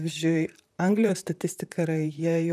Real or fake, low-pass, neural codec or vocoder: fake; 14.4 kHz; vocoder, 44.1 kHz, 128 mel bands, Pupu-Vocoder